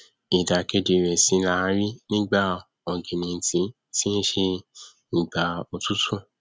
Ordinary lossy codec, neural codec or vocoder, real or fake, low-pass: none; none; real; none